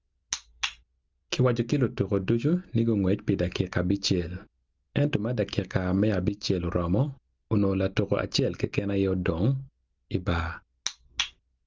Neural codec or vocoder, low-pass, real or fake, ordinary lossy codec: none; 7.2 kHz; real; Opus, 24 kbps